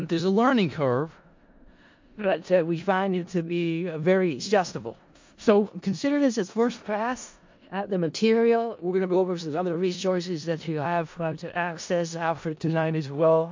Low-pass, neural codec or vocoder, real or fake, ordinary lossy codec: 7.2 kHz; codec, 16 kHz in and 24 kHz out, 0.4 kbps, LongCat-Audio-Codec, four codebook decoder; fake; MP3, 48 kbps